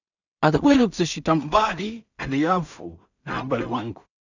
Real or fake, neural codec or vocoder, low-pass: fake; codec, 16 kHz in and 24 kHz out, 0.4 kbps, LongCat-Audio-Codec, two codebook decoder; 7.2 kHz